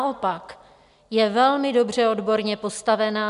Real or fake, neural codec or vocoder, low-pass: real; none; 10.8 kHz